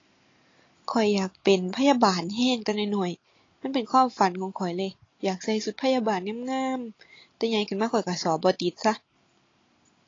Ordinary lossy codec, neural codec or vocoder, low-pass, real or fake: AAC, 32 kbps; none; 7.2 kHz; real